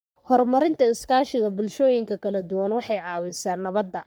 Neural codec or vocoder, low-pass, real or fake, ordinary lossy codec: codec, 44.1 kHz, 3.4 kbps, Pupu-Codec; none; fake; none